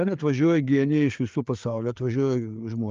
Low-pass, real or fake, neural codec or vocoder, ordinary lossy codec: 7.2 kHz; fake; codec, 16 kHz, 4 kbps, FreqCodec, larger model; Opus, 32 kbps